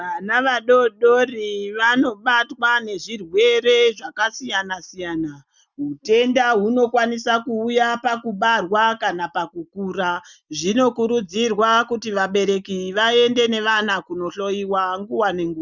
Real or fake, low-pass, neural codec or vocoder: real; 7.2 kHz; none